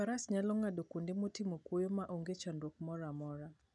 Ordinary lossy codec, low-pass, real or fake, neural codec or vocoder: none; 10.8 kHz; real; none